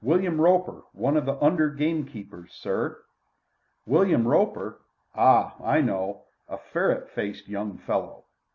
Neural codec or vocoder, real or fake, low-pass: none; real; 7.2 kHz